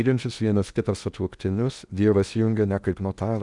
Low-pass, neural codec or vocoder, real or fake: 10.8 kHz; codec, 16 kHz in and 24 kHz out, 0.8 kbps, FocalCodec, streaming, 65536 codes; fake